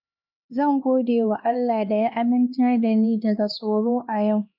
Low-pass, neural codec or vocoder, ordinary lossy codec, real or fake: 5.4 kHz; codec, 16 kHz, 2 kbps, X-Codec, HuBERT features, trained on LibriSpeech; none; fake